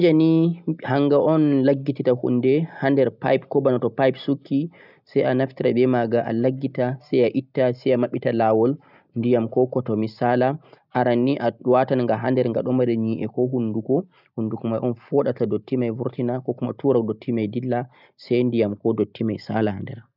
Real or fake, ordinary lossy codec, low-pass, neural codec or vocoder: real; none; 5.4 kHz; none